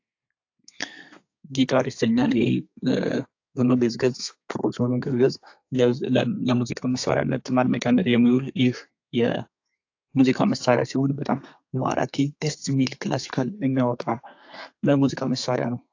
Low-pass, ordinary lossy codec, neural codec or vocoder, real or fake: 7.2 kHz; AAC, 48 kbps; codec, 32 kHz, 1.9 kbps, SNAC; fake